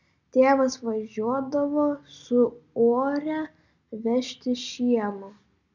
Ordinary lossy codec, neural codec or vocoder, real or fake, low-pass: MP3, 64 kbps; none; real; 7.2 kHz